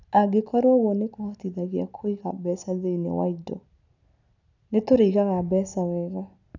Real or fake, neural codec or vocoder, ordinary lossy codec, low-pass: real; none; none; 7.2 kHz